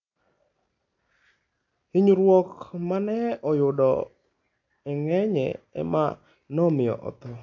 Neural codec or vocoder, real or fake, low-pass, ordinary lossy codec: none; real; 7.2 kHz; AAC, 48 kbps